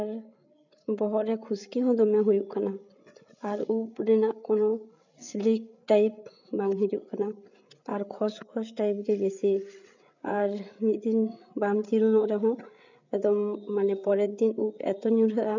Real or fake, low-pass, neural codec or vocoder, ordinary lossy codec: fake; 7.2 kHz; codec, 16 kHz, 8 kbps, FreqCodec, larger model; none